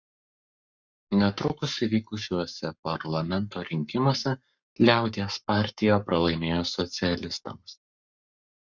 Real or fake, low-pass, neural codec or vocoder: fake; 7.2 kHz; codec, 44.1 kHz, 7.8 kbps, Pupu-Codec